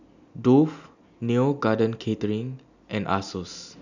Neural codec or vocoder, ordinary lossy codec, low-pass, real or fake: none; none; 7.2 kHz; real